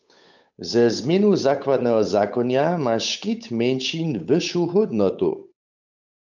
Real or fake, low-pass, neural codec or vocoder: fake; 7.2 kHz; codec, 16 kHz, 8 kbps, FunCodec, trained on Chinese and English, 25 frames a second